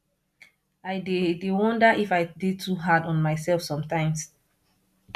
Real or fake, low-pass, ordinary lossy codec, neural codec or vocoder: real; 14.4 kHz; none; none